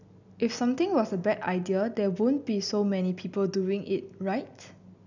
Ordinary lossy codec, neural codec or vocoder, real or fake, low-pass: none; none; real; 7.2 kHz